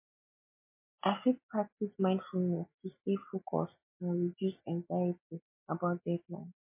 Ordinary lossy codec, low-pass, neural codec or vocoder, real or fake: MP3, 24 kbps; 3.6 kHz; none; real